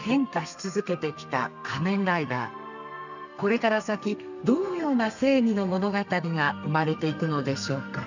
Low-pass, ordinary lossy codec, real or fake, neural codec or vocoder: 7.2 kHz; none; fake; codec, 32 kHz, 1.9 kbps, SNAC